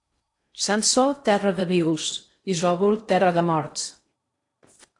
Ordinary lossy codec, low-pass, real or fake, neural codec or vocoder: AAC, 48 kbps; 10.8 kHz; fake; codec, 16 kHz in and 24 kHz out, 0.6 kbps, FocalCodec, streaming, 4096 codes